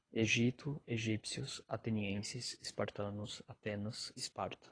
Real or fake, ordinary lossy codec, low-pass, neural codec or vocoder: fake; AAC, 32 kbps; 9.9 kHz; codec, 24 kHz, 6 kbps, HILCodec